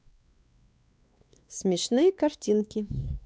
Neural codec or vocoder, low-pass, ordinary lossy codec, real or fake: codec, 16 kHz, 2 kbps, X-Codec, WavLM features, trained on Multilingual LibriSpeech; none; none; fake